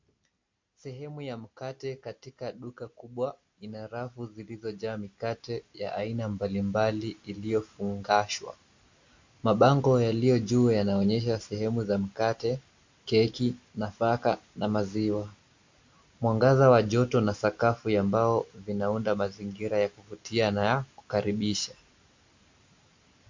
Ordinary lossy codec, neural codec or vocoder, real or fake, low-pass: MP3, 48 kbps; none; real; 7.2 kHz